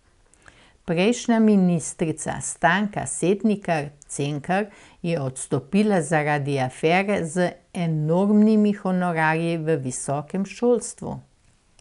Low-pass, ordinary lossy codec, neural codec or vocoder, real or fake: 10.8 kHz; none; none; real